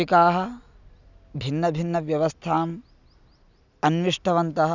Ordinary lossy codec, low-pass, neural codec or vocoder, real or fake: none; 7.2 kHz; none; real